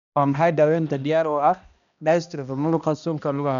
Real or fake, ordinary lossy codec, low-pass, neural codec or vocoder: fake; none; 7.2 kHz; codec, 16 kHz, 1 kbps, X-Codec, HuBERT features, trained on balanced general audio